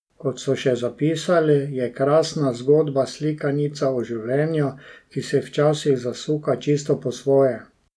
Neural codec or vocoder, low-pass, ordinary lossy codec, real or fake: none; none; none; real